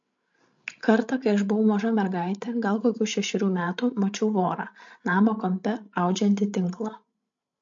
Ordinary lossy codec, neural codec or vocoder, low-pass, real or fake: MP3, 48 kbps; codec, 16 kHz, 16 kbps, FreqCodec, larger model; 7.2 kHz; fake